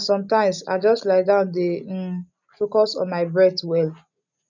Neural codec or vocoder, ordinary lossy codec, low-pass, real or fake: none; none; 7.2 kHz; real